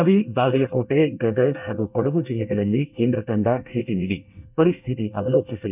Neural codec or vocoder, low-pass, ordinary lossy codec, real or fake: codec, 24 kHz, 1 kbps, SNAC; 3.6 kHz; none; fake